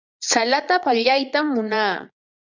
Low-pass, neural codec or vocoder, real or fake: 7.2 kHz; vocoder, 22.05 kHz, 80 mel bands, Vocos; fake